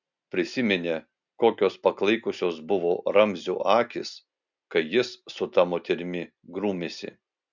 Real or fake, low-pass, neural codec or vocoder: real; 7.2 kHz; none